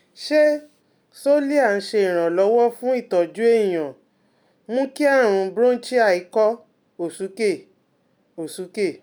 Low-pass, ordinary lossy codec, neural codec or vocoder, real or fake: none; none; none; real